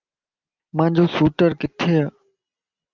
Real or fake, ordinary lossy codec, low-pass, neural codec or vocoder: real; Opus, 32 kbps; 7.2 kHz; none